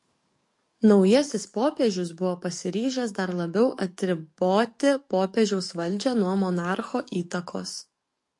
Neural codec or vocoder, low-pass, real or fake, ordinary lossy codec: codec, 44.1 kHz, 7.8 kbps, DAC; 10.8 kHz; fake; MP3, 48 kbps